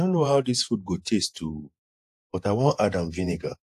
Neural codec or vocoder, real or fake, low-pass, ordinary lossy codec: vocoder, 48 kHz, 128 mel bands, Vocos; fake; 14.4 kHz; AAC, 64 kbps